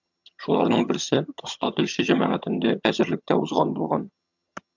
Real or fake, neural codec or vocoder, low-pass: fake; vocoder, 22.05 kHz, 80 mel bands, HiFi-GAN; 7.2 kHz